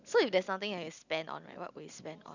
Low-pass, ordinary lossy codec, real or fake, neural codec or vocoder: 7.2 kHz; none; real; none